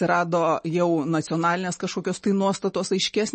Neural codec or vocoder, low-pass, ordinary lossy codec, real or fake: none; 10.8 kHz; MP3, 32 kbps; real